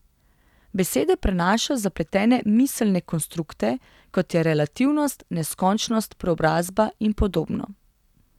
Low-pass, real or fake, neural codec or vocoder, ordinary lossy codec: 19.8 kHz; real; none; none